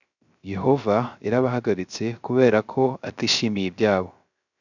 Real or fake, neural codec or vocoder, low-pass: fake; codec, 16 kHz, 0.3 kbps, FocalCodec; 7.2 kHz